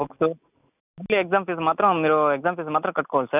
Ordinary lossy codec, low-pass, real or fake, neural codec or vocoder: none; 3.6 kHz; real; none